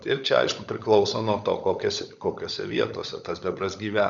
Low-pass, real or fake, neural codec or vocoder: 7.2 kHz; fake; codec, 16 kHz, 16 kbps, FunCodec, trained on LibriTTS, 50 frames a second